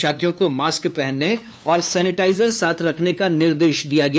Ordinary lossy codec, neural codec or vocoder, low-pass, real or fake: none; codec, 16 kHz, 2 kbps, FunCodec, trained on LibriTTS, 25 frames a second; none; fake